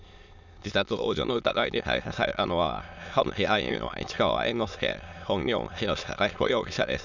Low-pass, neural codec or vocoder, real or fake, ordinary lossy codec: 7.2 kHz; autoencoder, 22.05 kHz, a latent of 192 numbers a frame, VITS, trained on many speakers; fake; none